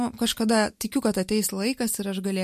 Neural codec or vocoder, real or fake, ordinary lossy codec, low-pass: none; real; MP3, 64 kbps; 14.4 kHz